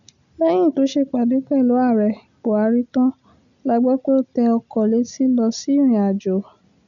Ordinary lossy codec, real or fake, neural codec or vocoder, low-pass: MP3, 96 kbps; real; none; 7.2 kHz